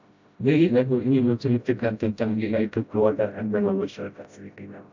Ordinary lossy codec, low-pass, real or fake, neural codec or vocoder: AAC, 48 kbps; 7.2 kHz; fake; codec, 16 kHz, 0.5 kbps, FreqCodec, smaller model